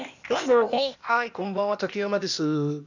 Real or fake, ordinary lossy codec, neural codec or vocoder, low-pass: fake; none; codec, 16 kHz, 0.8 kbps, ZipCodec; 7.2 kHz